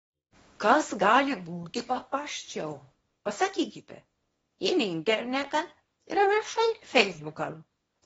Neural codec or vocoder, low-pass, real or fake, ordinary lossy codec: codec, 24 kHz, 0.9 kbps, WavTokenizer, small release; 10.8 kHz; fake; AAC, 24 kbps